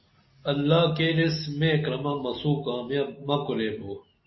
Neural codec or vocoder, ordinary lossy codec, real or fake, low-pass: none; MP3, 24 kbps; real; 7.2 kHz